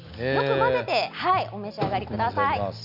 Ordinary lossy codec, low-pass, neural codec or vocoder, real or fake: none; 5.4 kHz; none; real